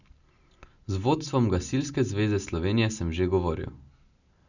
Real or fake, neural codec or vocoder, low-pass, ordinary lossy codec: real; none; 7.2 kHz; none